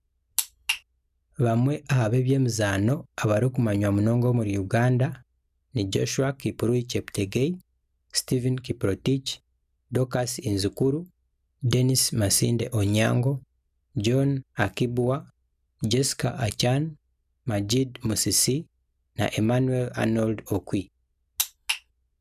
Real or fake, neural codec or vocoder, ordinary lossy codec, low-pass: real; none; none; 14.4 kHz